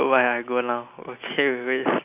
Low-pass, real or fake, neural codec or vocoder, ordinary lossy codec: 3.6 kHz; real; none; none